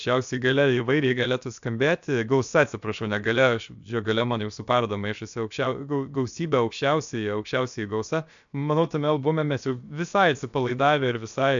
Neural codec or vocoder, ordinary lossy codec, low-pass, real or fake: codec, 16 kHz, about 1 kbps, DyCAST, with the encoder's durations; MP3, 64 kbps; 7.2 kHz; fake